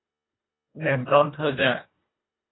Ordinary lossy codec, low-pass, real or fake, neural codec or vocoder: AAC, 16 kbps; 7.2 kHz; fake; codec, 24 kHz, 1.5 kbps, HILCodec